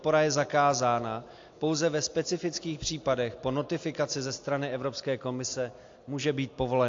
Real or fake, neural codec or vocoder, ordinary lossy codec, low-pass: real; none; AAC, 48 kbps; 7.2 kHz